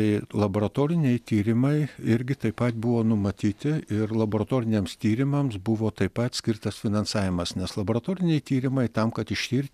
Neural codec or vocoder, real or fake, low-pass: none; real; 14.4 kHz